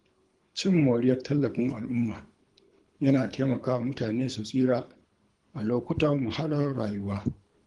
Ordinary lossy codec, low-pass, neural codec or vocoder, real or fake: Opus, 24 kbps; 10.8 kHz; codec, 24 kHz, 3 kbps, HILCodec; fake